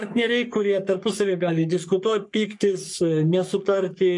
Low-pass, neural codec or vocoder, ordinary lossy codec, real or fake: 10.8 kHz; codec, 44.1 kHz, 3.4 kbps, Pupu-Codec; MP3, 64 kbps; fake